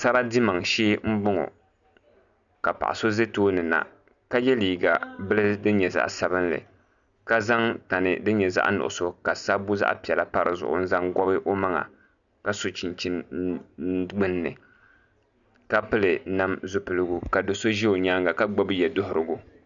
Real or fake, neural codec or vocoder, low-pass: real; none; 7.2 kHz